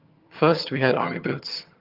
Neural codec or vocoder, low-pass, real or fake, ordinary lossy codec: vocoder, 22.05 kHz, 80 mel bands, HiFi-GAN; 5.4 kHz; fake; Opus, 24 kbps